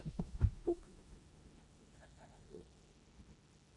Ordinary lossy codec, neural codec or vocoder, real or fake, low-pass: AAC, 48 kbps; codec, 16 kHz in and 24 kHz out, 0.9 kbps, LongCat-Audio-Codec, four codebook decoder; fake; 10.8 kHz